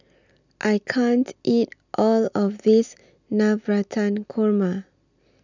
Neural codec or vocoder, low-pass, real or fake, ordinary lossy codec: none; 7.2 kHz; real; none